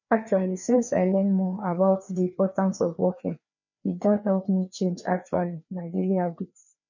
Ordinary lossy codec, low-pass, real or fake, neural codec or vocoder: none; 7.2 kHz; fake; codec, 16 kHz, 2 kbps, FreqCodec, larger model